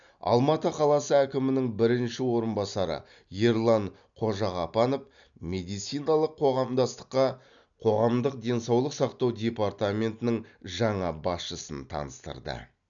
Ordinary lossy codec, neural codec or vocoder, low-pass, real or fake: none; none; 7.2 kHz; real